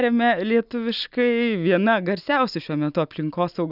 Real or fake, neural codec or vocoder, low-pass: real; none; 5.4 kHz